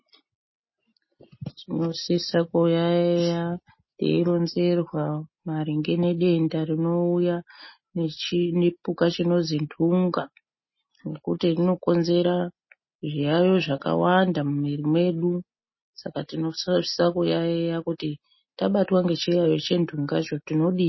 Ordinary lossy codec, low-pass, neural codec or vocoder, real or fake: MP3, 24 kbps; 7.2 kHz; none; real